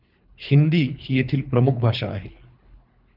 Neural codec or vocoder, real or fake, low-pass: codec, 24 kHz, 3 kbps, HILCodec; fake; 5.4 kHz